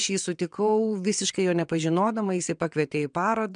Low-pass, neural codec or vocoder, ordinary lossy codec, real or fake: 9.9 kHz; vocoder, 22.05 kHz, 80 mel bands, WaveNeXt; MP3, 96 kbps; fake